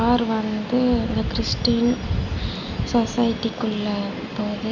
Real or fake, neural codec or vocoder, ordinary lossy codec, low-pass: real; none; none; 7.2 kHz